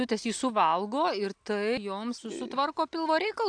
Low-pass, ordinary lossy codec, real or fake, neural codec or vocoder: 9.9 kHz; AAC, 64 kbps; real; none